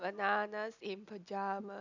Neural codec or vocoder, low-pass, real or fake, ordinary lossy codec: none; 7.2 kHz; real; none